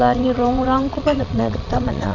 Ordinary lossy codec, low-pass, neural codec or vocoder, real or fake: none; 7.2 kHz; vocoder, 22.05 kHz, 80 mel bands, Vocos; fake